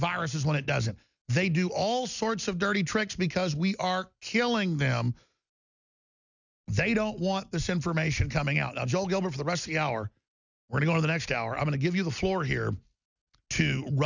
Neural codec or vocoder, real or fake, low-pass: none; real; 7.2 kHz